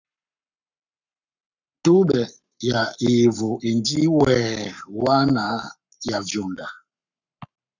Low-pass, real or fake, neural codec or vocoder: 7.2 kHz; fake; codec, 44.1 kHz, 7.8 kbps, Pupu-Codec